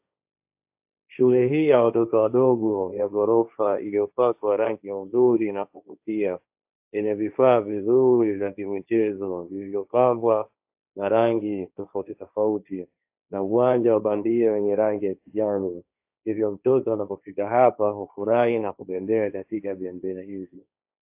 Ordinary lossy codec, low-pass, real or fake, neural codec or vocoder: AAC, 32 kbps; 3.6 kHz; fake; codec, 16 kHz, 1.1 kbps, Voila-Tokenizer